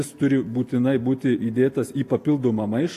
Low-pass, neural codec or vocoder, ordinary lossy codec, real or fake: 14.4 kHz; none; AAC, 64 kbps; real